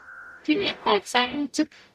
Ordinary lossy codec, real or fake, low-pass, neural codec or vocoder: none; fake; 14.4 kHz; codec, 44.1 kHz, 0.9 kbps, DAC